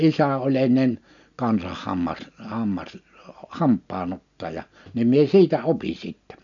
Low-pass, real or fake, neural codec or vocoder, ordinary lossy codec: 7.2 kHz; real; none; none